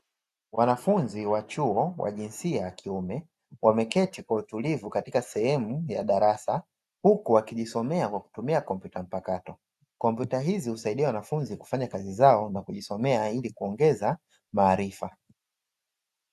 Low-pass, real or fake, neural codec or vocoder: 14.4 kHz; real; none